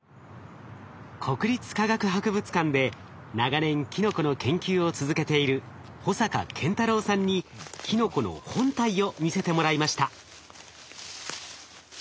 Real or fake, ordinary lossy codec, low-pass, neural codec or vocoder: real; none; none; none